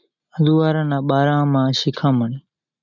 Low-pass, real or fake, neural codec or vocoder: 7.2 kHz; real; none